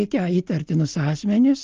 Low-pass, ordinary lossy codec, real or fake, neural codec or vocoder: 7.2 kHz; Opus, 24 kbps; real; none